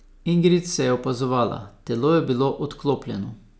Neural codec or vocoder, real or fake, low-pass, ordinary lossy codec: none; real; none; none